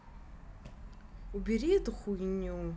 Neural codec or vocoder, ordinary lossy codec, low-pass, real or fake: none; none; none; real